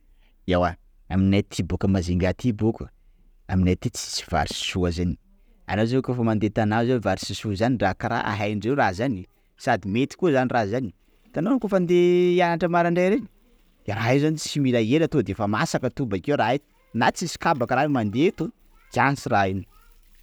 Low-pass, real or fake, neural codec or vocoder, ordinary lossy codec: none; real; none; none